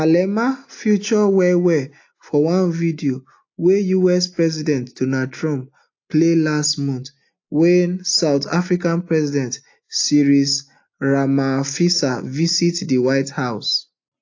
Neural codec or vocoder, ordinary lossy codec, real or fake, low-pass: none; AAC, 48 kbps; real; 7.2 kHz